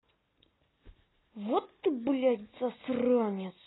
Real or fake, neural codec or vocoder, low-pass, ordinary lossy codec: real; none; 7.2 kHz; AAC, 16 kbps